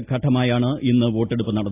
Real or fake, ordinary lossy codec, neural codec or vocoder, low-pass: real; AAC, 24 kbps; none; 3.6 kHz